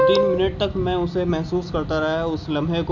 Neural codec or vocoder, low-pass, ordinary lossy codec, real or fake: none; 7.2 kHz; none; real